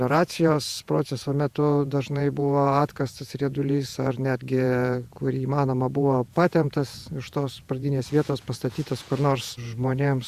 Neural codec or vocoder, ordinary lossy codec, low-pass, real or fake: vocoder, 48 kHz, 128 mel bands, Vocos; Opus, 64 kbps; 14.4 kHz; fake